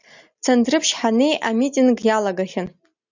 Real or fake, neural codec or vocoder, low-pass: real; none; 7.2 kHz